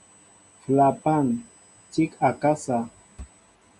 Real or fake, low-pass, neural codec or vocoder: real; 10.8 kHz; none